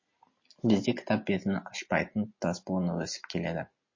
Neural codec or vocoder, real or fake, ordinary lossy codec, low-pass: none; real; MP3, 32 kbps; 7.2 kHz